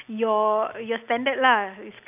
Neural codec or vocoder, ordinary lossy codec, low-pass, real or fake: none; none; 3.6 kHz; real